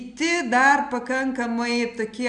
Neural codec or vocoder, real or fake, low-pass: none; real; 9.9 kHz